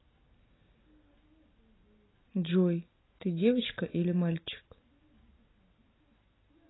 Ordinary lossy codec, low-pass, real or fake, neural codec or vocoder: AAC, 16 kbps; 7.2 kHz; real; none